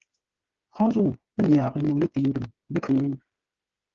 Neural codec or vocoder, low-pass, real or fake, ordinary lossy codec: codec, 16 kHz, 8 kbps, FreqCodec, smaller model; 7.2 kHz; fake; Opus, 16 kbps